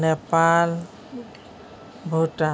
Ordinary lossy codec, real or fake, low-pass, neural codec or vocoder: none; real; none; none